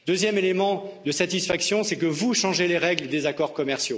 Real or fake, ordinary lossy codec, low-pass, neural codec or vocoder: real; none; none; none